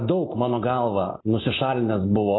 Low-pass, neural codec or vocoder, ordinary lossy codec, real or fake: 7.2 kHz; none; AAC, 16 kbps; real